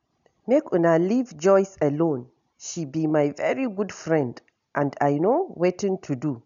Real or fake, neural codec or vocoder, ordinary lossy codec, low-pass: real; none; none; 7.2 kHz